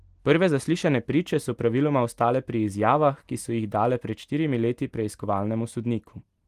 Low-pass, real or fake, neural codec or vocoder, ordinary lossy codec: 14.4 kHz; real; none; Opus, 24 kbps